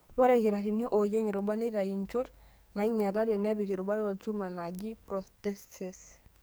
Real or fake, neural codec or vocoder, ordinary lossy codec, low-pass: fake; codec, 44.1 kHz, 2.6 kbps, SNAC; none; none